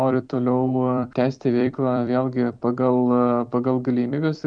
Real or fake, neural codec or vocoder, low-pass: fake; vocoder, 44.1 kHz, 128 mel bands every 256 samples, BigVGAN v2; 9.9 kHz